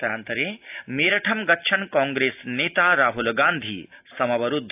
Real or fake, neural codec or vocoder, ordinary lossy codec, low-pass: real; none; AAC, 32 kbps; 3.6 kHz